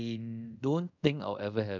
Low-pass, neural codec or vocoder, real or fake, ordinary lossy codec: 7.2 kHz; codec, 24 kHz, 0.5 kbps, DualCodec; fake; none